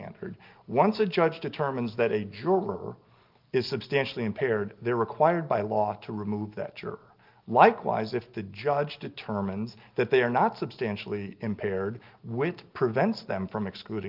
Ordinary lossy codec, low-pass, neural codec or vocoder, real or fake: Opus, 32 kbps; 5.4 kHz; none; real